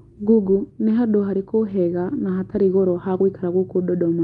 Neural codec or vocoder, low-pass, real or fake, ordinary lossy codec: none; 10.8 kHz; real; Opus, 32 kbps